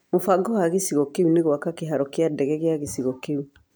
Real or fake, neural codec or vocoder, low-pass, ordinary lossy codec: real; none; none; none